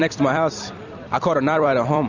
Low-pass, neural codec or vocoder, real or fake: 7.2 kHz; vocoder, 44.1 kHz, 128 mel bands every 256 samples, BigVGAN v2; fake